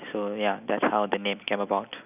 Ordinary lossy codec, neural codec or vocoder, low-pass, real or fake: none; none; 3.6 kHz; real